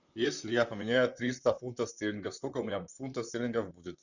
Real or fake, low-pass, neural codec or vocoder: fake; 7.2 kHz; vocoder, 44.1 kHz, 128 mel bands, Pupu-Vocoder